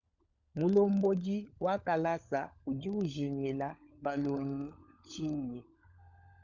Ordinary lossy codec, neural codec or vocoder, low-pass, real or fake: Opus, 64 kbps; codec, 16 kHz, 16 kbps, FunCodec, trained on LibriTTS, 50 frames a second; 7.2 kHz; fake